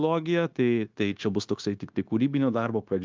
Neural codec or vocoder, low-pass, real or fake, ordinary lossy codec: codec, 16 kHz, 0.9 kbps, LongCat-Audio-Codec; 7.2 kHz; fake; Opus, 24 kbps